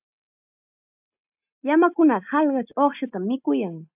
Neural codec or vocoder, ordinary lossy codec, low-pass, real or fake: none; AAC, 32 kbps; 3.6 kHz; real